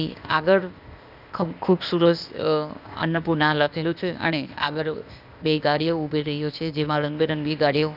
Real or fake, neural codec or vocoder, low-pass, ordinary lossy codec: fake; codec, 16 kHz, 0.8 kbps, ZipCodec; 5.4 kHz; none